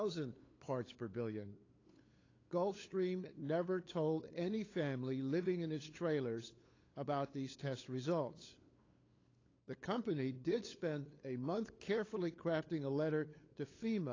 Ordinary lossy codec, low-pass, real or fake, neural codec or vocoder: AAC, 32 kbps; 7.2 kHz; fake; codec, 16 kHz, 8 kbps, FunCodec, trained on LibriTTS, 25 frames a second